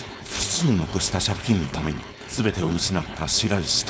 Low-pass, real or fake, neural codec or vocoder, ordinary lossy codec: none; fake; codec, 16 kHz, 4.8 kbps, FACodec; none